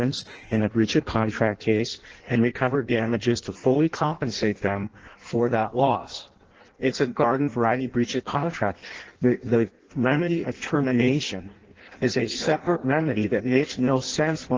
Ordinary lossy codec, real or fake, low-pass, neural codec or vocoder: Opus, 16 kbps; fake; 7.2 kHz; codec, 16 kHz in and 24 kHz out, 0.6 kbps, FireRedTTS-2 codec